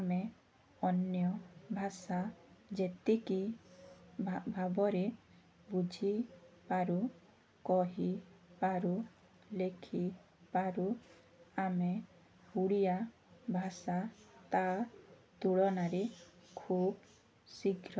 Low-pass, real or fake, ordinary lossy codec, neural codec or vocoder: none; real; none; none